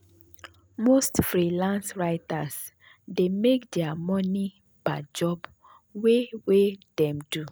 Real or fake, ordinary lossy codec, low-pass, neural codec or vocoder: real; none; none; none